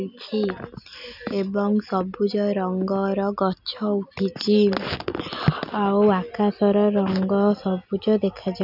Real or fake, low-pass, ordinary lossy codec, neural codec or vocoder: real; 5.4 kHz; none; none